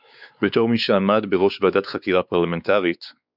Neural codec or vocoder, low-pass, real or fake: codec, 16 kHz, 4 kbps, X-Codec, WavLM features, trained on Multilingual LibriSpeech; 5.4 kHz; fake